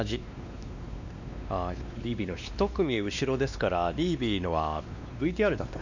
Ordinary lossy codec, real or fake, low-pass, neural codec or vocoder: none; fake; 7.2 kHz; codec, 16 kHz, 2 kbps, X-Codec, WavLM features, trained on Multilingual LibriSpeech